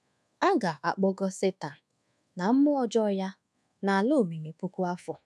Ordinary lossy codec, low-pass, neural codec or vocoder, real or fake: none; none; codec, 24 kHz, 1.2 kbps, DualCodec; fake